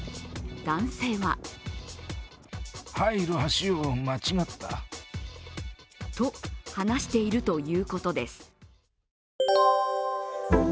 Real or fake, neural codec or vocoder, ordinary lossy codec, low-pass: real; none; none; none